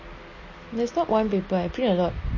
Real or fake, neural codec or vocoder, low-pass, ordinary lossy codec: real; none; 7.2 kHz; MP3, 32 kbps